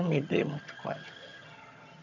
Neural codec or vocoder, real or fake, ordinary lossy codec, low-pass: vocoder, 22.05 kHz, 80 mel bands, HiFi-GAN; fake; none; 7.2 kHz